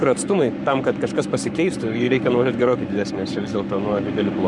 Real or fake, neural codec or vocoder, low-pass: fake; codec, 44.1 kHz, 7.8 kbps, Pupu-Codec; 10.8 kHz